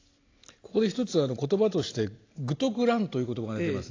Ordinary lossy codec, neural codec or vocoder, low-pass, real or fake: AAC, 32 kbps; none; 7.2 kHz; real